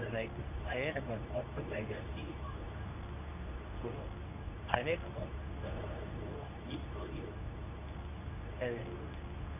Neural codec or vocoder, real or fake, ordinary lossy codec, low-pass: codec, 24 kHz, 0.9 kbps, WavTokenizer, medium speech release version 2; fake; AAC, 32 kbps; 3.6 kHz